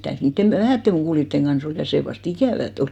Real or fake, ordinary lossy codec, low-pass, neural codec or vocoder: real; none; 19.8 kHz; none